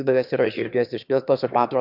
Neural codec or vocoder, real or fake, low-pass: autoencoder, 22.05 kHz, a latent of 192 numbers a frame, VITS, trained on one speaker; fake; 5.4 kHz